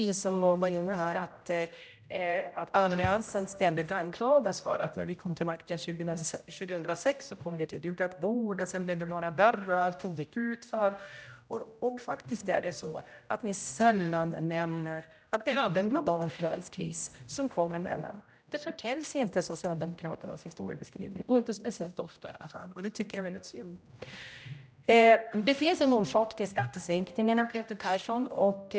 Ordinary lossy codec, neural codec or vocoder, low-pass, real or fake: none; codec, 16 kHz, 0.5 kbps, X-Codec, HuBERT features, trained on general audio; none; fake